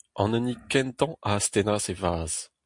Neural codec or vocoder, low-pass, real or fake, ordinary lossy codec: none; 10.8 kHz; real; MP3, 64 kbps